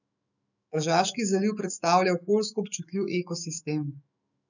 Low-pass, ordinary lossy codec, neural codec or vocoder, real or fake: 7.2 kHz; none; autoencoder, 48 kHz, 128 numbers a frame, DAC-VAE, trained on Japanese speech; fake